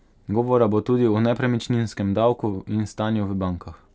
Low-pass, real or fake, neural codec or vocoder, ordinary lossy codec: none; real; none; none